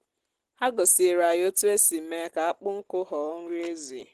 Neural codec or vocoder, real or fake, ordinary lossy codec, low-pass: none; real; Opus, 16 kbps; 14.4 kHz